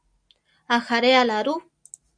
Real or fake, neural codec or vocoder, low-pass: real; none; 9.9 kHz